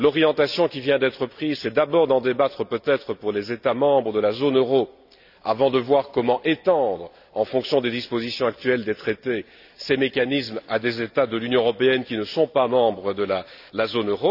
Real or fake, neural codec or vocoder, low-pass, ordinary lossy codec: real; none; 5.4 kHz; none